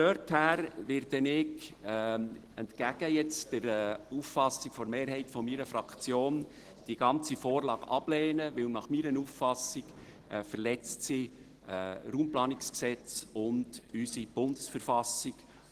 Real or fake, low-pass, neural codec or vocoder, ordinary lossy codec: real; 14.4 kHz; none; Opus, 16 kbps